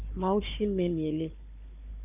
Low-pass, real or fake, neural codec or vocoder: 3.6 kHz; fake; codec, 16 kHz, 4 kbps, FunCodec, trained on Chinese and English, 50 frames a second